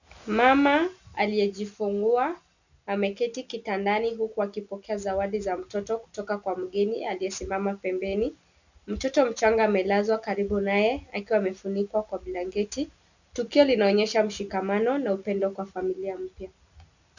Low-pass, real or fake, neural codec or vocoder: 7.2 kHz; real; none